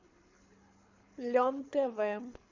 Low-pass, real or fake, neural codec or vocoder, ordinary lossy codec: 7.2 kHz; fake; codec, 24 kHz, 6 kbps, HILCodec; MP3, 64 kbps